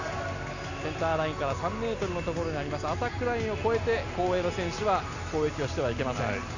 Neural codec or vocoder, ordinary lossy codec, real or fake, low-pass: none; none; real; 7.2 kHz